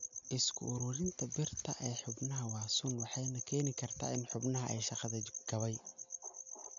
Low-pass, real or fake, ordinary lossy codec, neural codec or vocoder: 7.2 kHz; real; MP3, 96 kbps; none